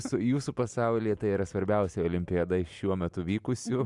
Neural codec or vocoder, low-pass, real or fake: none; 10.8 kHz; real